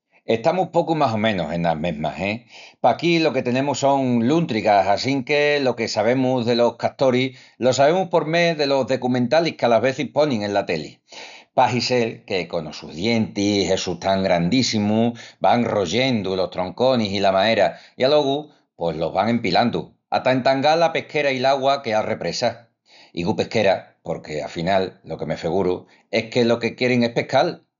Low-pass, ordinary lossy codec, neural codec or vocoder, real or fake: 7.2 kHz; none; none; real